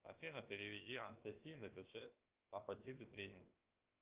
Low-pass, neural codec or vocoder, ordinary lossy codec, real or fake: 3.6 kHz; codec, 16 kHz, about 1 kbps, DyCAST, with the encoder's durations; Opus, 24 kbps; fake